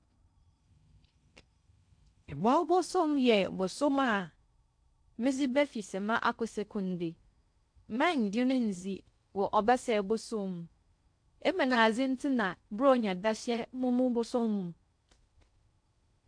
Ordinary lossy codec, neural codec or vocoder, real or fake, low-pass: AAC, 64 kbps; codec, 16 kHz in and 24 kHz out, 0.6 kbps, FocalCodec, streaming, 4096 codes; fake; 9.9 kHz